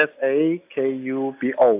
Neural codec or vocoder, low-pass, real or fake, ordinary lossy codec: none; 3.6 kHz; real; AAC, 32 kbps